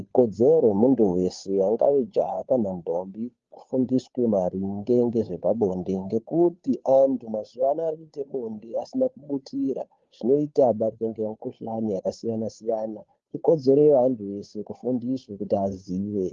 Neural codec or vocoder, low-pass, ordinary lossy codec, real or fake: codec, 16 kHz, 2 kbps, FunCodec, trained on Chinese and English, 25 frames a second; 7.2 kHz; Opus, 32 kbps; fake